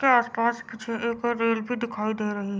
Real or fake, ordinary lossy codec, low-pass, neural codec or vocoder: real; none; none; none